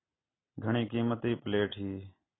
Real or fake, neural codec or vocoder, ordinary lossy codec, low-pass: real; none; AAC, 16 kbps; 7.2 kHz